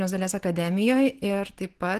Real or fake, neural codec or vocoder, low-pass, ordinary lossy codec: real; none; 14.4 kHz; Opus, 16 kbps